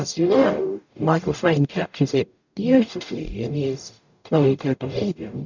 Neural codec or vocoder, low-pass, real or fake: codec, 44.1 kHz, 0.9 kbps, DAC; 7.2 kHz; fake